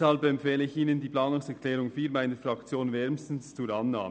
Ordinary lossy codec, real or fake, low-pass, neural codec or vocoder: none; real; none; none